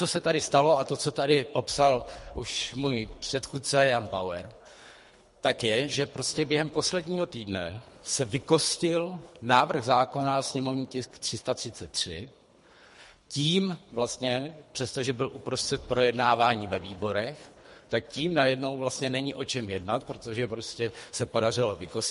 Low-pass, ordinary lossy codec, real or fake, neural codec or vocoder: 10.8 kHz; MP3, 48 kbps; fake; codec, 24 kHz, 3 kbps, HILCodec